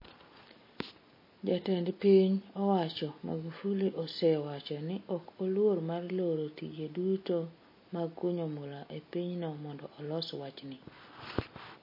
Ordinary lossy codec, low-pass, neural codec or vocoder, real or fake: MP3, 24 kbps; 5.4 kHz; none; real